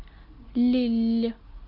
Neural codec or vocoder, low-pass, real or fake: none; 5.4 kHz; real